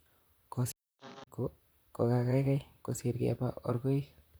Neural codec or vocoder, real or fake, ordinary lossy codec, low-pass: vocoder, 44.1 kHz, 128 mel bands, Pupu-Vocoder; fake; none; none